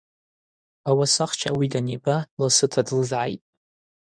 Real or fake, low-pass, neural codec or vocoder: fake; 9.9 kHz; codec, 24 kHz, 0.9 kbps, WavTokenizer, medium speech release version 2